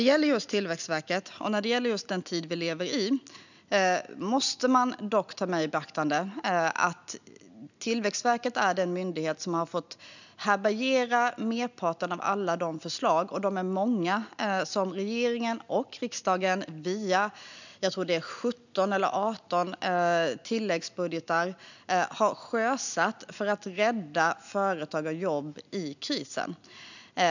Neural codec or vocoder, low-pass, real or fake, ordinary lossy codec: none; 7.2 kHz; real; none